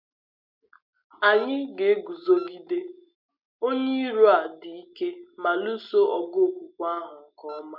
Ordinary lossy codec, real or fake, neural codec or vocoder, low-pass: none; real; none; 5.4 kHz